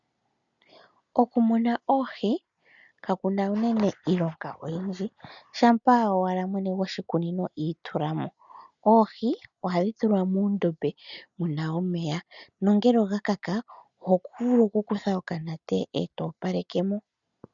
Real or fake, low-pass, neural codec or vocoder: real; 7.2 kHz; none